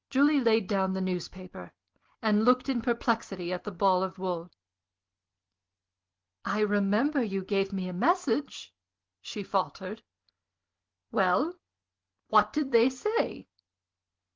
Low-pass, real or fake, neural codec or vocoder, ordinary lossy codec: 7.2 kHz; real; none; Opus, 16 kbps